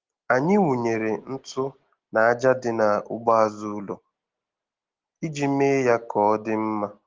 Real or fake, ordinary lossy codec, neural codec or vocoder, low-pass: real; Opus, 16 kbps; none; 7.2 kHz